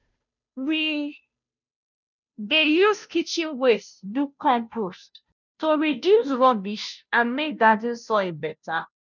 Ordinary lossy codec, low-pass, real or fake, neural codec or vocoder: none; 7.2 kHz; fake; codec, 16 kHz, 0.5 kbps, FunCodec, trained on Chinese and English, 25 frames a second